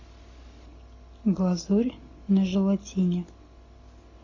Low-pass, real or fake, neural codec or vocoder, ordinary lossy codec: 7.2 kHz; real; none; AAC, 32 kbps